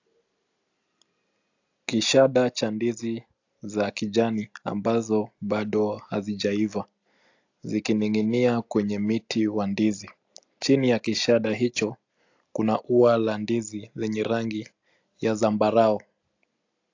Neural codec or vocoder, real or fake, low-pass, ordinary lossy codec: none; real; 7.2 kHz; AAC, 48 kbps